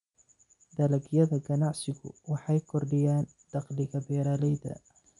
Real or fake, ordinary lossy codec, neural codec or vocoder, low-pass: real; none; none; 10.8 kHz